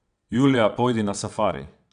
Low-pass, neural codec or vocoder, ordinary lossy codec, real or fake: 9.9 kHz; vocoder, 22.05 kHz, 80 mel bands, WaveNeXt; none; fake